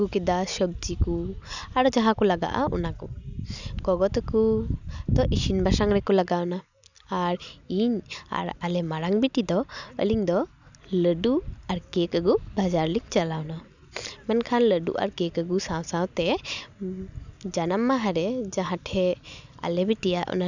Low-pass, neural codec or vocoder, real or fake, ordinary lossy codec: 7.2 kHz; none; real; none